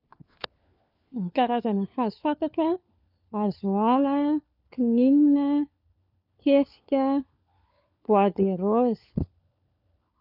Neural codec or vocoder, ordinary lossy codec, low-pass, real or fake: codec, 16 kHz, 4 kbps, FunCodec, trained on LibriTTS, 50 frames a second; none; 5.4 kHz; fake